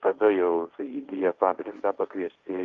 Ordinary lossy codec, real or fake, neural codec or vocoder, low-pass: Opus, 32 kbps; fake; codec, 16 kHz, 1.1 kbps, Voila-Tokenizer; 7.2 kHz